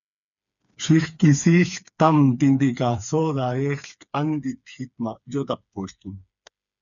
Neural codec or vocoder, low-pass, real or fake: codec, 16 kHz, 4 kbps, FreqCodec, smaller model; 7.2 kHz; fake